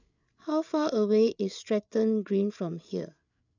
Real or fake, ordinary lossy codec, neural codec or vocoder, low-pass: fake; none; codec, 16 kHz, 8 kbps, FreqCodec, larger model; 7.2 kHz